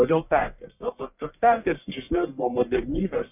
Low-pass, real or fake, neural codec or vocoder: 3.6 kHz; fake; codec, 44.1 kHz, 1.7 kbps, Pupu-Codec